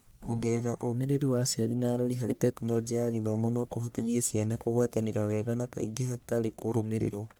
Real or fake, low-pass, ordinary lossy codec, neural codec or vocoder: fake; none; none; codec, 44.1 kHz, 1.7 kbps, Pupu-Codec